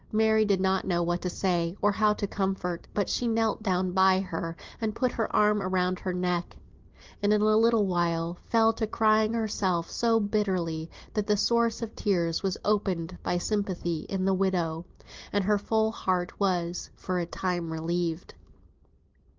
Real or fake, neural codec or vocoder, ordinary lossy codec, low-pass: real; none; Opus, 32 kbps; 7.2 kHz